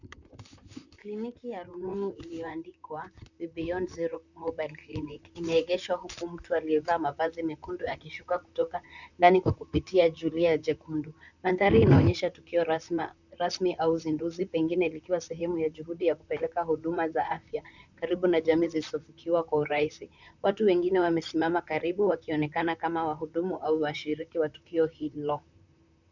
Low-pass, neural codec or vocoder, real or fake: 7.2 kHz; vocoder, 44.1 kHz, 128 mel bands, Pupu-Vocoder; fake